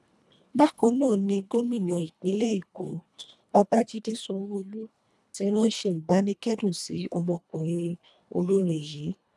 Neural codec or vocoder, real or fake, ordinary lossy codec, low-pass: codec, 24 kHz, 1.5 kbps, HILCodec; fake; none; none